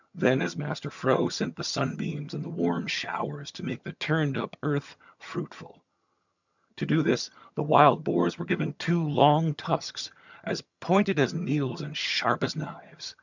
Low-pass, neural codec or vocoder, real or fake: 7.2 kHz; vocoder, 22.05 kHz, 80 mel bands, HiFi-GAN; fake